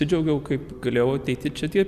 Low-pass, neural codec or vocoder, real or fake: 14.4 kHz; none; real